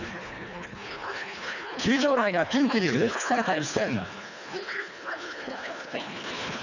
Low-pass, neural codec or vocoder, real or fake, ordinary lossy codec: 7.2 kHz; codec, 24 kHz, 1.5 kbps, HILCodec; fake; none